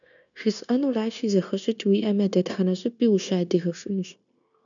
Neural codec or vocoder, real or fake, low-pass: codec, 16 kHz, 0.9 kbps, LongCat-Audio-Codec; fake; 7.2 kHz